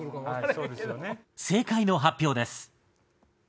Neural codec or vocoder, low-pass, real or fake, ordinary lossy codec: none; none; real; none